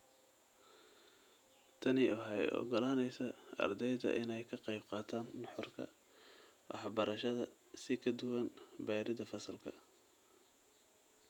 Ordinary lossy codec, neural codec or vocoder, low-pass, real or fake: none; vocoder, 48 kHz, 128 mel bands, Vocos; 19.8 kHz; fake